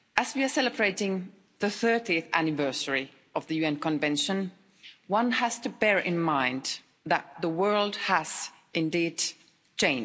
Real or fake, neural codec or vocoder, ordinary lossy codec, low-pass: real; none; none; none